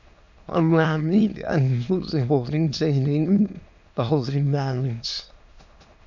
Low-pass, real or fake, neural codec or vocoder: 7.2 kHz; fake; autoencoder, 22.05 kHz, a latent of 192 numbers a frame, VITS, trained on many speakers